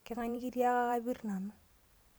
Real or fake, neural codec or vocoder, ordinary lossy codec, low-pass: fake; vocoder, 44.1 kHz, 128 mel bands every 512 samples, BigVGAN v2; none; none